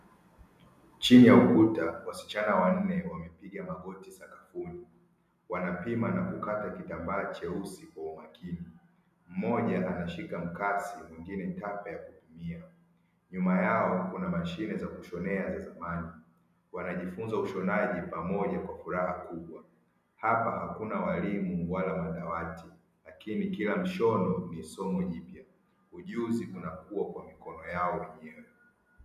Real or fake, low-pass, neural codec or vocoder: real; 14.4 kHz; none